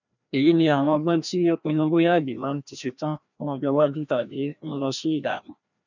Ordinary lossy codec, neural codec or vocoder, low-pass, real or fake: none; codec, 16 kHz, 1 kbps, FreqCodec, larger model; 7.2 kHz; fake